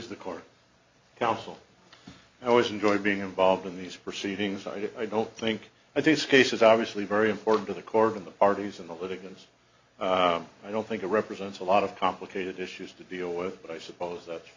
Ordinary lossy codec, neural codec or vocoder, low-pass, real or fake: MP3, 48 kbps; none; 7.2 kHz; real